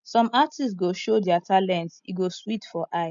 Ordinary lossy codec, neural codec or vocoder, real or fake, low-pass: MP3, 64 kbps; none; real; 7.2 kHz